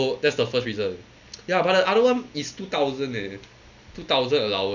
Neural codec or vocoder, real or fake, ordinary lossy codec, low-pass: none; real; none; 7.2 kHz